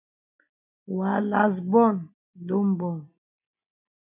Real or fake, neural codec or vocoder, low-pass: real; none; 3.6 kHz